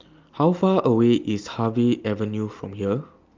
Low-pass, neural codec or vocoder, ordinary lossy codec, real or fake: 7.2 kHz; none; Opus, 32 kbps; real